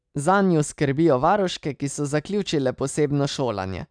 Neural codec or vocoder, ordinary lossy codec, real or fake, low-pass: none; none; real; 9.9 kHz